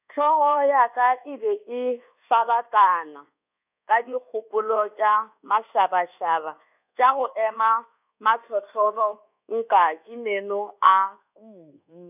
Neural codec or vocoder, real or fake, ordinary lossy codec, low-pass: codec, 24 kHz, 1.2 kbps, DualCodec; fake; none; 3.6 kHz